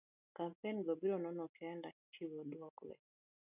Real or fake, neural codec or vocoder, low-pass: real; none; 3.6 kHz